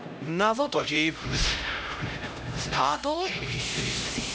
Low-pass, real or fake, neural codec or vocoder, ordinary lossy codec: none; fake; codec, 16 kHz, 0.5 kbps, X-Codec, HuBERT features, trained on LibriSpeech; none